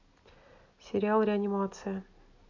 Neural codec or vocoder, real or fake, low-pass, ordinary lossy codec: none; real; 7.2 kHz; none